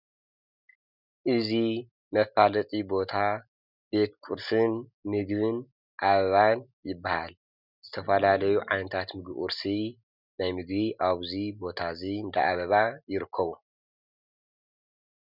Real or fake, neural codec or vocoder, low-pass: real; none; 5.4 kHz